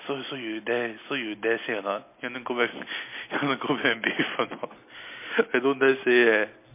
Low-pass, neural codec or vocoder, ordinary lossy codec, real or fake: 3.6 kHz; none; MP3, 24 kbps; real